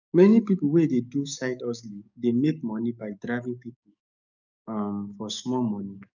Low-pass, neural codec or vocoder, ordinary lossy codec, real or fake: 7.2 kHz; codec, 44.1 kHz, 7.8 kbps, DAC; none; fake